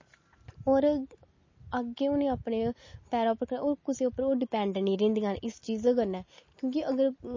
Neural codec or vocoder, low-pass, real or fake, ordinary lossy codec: none; 7.2 kHz; real; MP3, 32 kbps